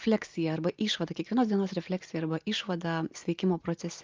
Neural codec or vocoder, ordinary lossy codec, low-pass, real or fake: none; Opus, 32 kbps; 7.2 kHz; real